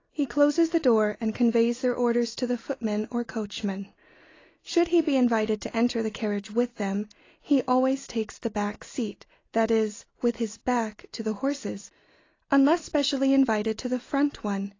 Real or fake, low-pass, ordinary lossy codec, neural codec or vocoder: real; 7.2 kHz; AAC, 32 kbps; none